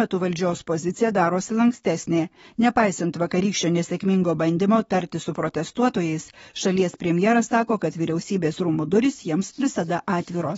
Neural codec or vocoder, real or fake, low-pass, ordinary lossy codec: codec, 44.1 kHz, 7.8 kbps, DAC; fake; 19.8 kHz; AAC, 24 kbps